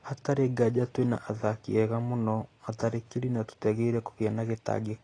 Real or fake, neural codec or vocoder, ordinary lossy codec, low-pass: real; none; AAC, 32 kbps; 9.9 kHz